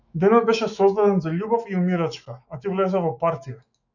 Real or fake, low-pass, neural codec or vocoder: fake; 7.2 kHz; autoencoder, 48 kHz, 128 numbers a frame, DAC-VAE, trained on Japanese speech